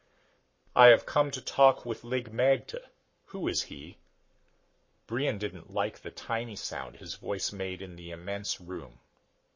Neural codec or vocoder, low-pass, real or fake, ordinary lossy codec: codec, 44.1 kHz, 7.8 kbps, Pupu-Codec; 7.2 kHz; fake; MP3, 32 kbps